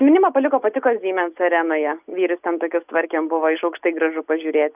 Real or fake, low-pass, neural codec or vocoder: real; 3.6 kHz; none